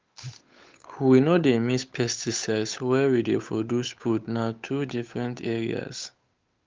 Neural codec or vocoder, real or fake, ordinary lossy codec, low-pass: codec, 16 kHz, 8 kbps, FunCodec, trained on Chinese and English, 25 frames a second; fake; Opus, 24 kbps; 7.2 kHz